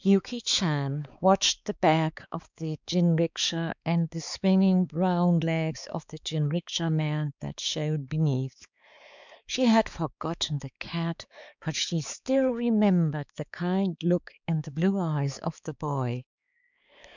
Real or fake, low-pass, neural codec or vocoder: fake; 7.2 kHz; codec, 16 kHz, 4 kbps, X-Codec, HuBERT features, trained on balanced general audio